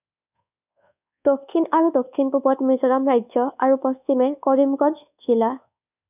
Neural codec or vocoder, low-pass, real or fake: codec, 24 kHz, 1.2 kbps, DualCodec; 3.6 kHz; fake